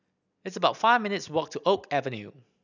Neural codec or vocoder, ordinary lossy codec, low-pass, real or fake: none; none; 7.2 kHz; real